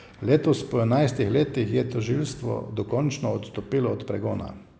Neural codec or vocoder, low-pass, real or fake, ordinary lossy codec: none; none; real; none